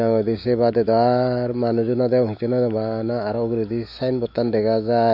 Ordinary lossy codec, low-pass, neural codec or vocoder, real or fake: none; 5.4 kHz; autoencoder, 48 kHz, 128 numbers a frame, DAC-VAE, trained on Japanese speech; fake